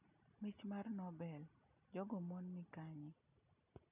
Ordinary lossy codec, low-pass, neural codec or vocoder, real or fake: none; 3.6 kHz; none; real